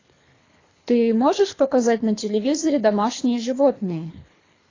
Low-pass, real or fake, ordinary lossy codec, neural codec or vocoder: 7.2 kHz; fake; AAC, 32 kbps; codec, 24 kHz, 3 kbps, HILCodec